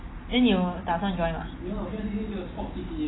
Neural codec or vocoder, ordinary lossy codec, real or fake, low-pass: none; AAC, 16 kbps; real; 7.2 kHz